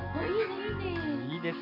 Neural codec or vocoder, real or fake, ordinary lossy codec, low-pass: none; real; none; 5.4 kHz